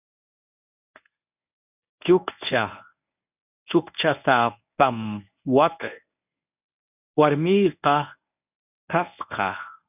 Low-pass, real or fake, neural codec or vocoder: 3.6 kHz; fake; codec, 24 kHz, 0.9 kbps, WavTokenizer, medium speech release version 1